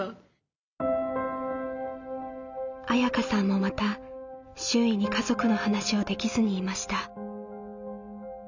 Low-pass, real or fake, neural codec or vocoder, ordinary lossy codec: 7.2 kHz; real; none; none